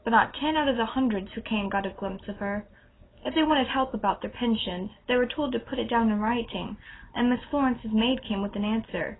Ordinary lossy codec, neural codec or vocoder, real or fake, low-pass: AAC, 16 kbps; none; real; 7.2 kHz